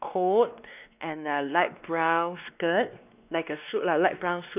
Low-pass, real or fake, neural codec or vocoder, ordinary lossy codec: 3.6 kHz; fake; codec, 16 kHz, 2 kbps, X-Codec, WavLM features, trained on Multilingual LibriSpeech; none